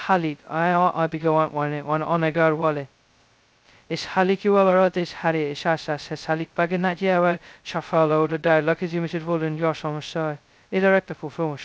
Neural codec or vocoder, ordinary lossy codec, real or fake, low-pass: codec, 16 kHz, 0.2 kbps, FocalCodec; none; fake; none